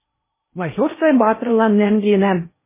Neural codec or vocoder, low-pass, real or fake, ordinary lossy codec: codec, 16 kHz in and 24 kHz out, 0.6 kbps, FocalCodec, streaming, 4096 codes; 3.6 kHz; fake; MP3, 16 kbps